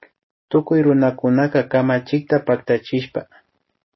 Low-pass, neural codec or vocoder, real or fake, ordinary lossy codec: 7.2 kHz; none; real; MP3, 24 kbps